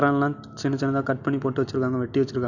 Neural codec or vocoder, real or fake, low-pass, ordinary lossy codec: none; real; 7.2 kHz; none